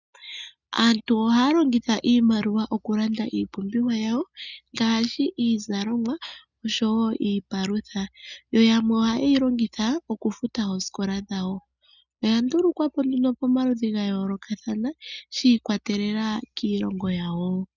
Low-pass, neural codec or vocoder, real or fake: 7.2 kHz; none; real